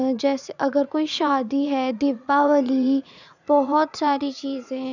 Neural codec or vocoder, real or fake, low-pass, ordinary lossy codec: vocoder, 44.1 kHz, 80 mel bands, Vocos; fake; 7.2 kHz; none